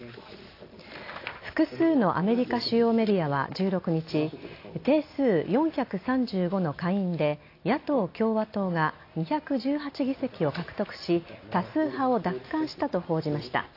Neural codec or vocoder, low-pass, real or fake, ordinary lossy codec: none; 5.4 kHz; real; AAC, 32 kbps